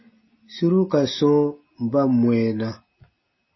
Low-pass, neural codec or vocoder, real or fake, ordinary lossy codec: 7.2 kHz; none; real; MP3, 24 kbps